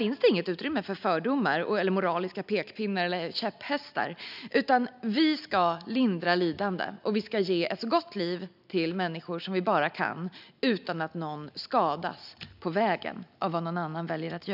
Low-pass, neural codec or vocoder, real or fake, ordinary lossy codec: 5.4 kHz; none; real; none